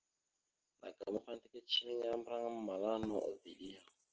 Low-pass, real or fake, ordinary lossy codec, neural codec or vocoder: 7.2 kHz; real; Opus, 16 kbps; none